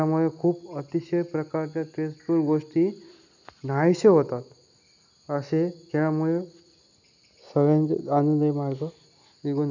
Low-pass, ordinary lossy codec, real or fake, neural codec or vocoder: 7.2 kHz; none; real; none